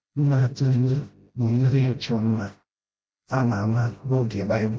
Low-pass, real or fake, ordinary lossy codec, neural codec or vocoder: none; fake; none; codec, 16 kHz, 0.5 kbps, FreqCodec, smaller model